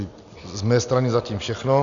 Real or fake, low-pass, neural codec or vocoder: real; 7.2 kHz; none